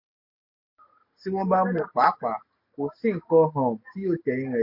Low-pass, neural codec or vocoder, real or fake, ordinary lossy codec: 5.4 kHz; none; real; MP3, 32 kbps